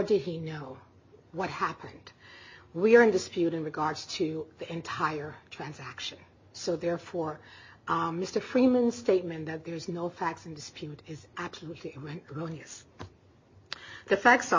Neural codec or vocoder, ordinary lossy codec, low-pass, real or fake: none; MP3, 32 kbps; 7.2 kHz; real